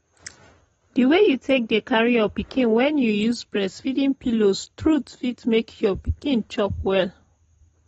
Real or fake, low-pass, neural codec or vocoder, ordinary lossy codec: real; 10.8 kHz; none; AAC, 24 kbps